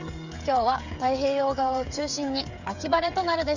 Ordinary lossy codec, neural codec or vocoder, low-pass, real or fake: none; codec, 16 kHz, 8 kbps, FreqCodec, smaller model; 7.2 kHz; fake